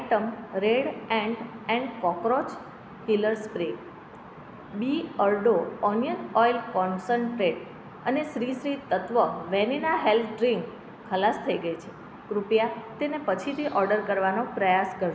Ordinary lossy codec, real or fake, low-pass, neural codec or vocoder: none; real; none; none